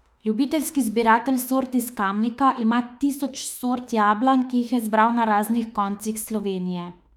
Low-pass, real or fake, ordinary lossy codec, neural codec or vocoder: 19.8 kHz; fake; none; autoencoder, 48 kHz, 32 numbers a frame, DAC-VAE, trained on Japanese speech